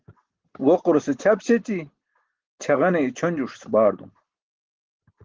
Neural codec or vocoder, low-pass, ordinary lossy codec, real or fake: none; 7.2 kHz; Opus, 16 kbps; real